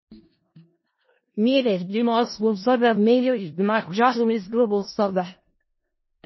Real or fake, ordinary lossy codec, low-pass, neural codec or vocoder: fake; MP3, 24 kbps; 7.2 kHz; codec, 16 kHz in and 24 kHz out, 0.4 kbps, LongCat-Audio-Codec, four codebook decoder